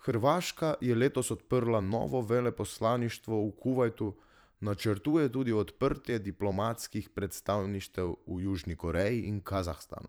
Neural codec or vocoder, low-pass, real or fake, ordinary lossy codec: vocoder, 44.1 kHz, 128 mel bands every 512 samples, BigVGAN v2; none; fake; none